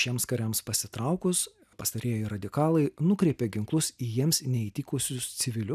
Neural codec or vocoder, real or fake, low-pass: none; real; 14.4 kHz